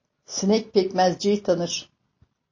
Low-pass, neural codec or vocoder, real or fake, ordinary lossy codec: 7.2 kHz; none; real; MP3, 32 kbps